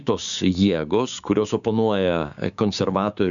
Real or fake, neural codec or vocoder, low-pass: fake; codec, 16 kHz, 6 kbps, DAC; 7.2 kHz